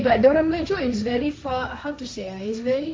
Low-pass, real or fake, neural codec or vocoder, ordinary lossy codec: none; fake; codec, 16 kHz, 1.1 kbps, Voila-Tokenizer; none